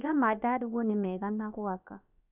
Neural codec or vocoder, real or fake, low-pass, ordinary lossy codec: codec, 16 kHz, about 1 kbps, DyCAST, with the encoder's durations; fake; 3.6 kHz; none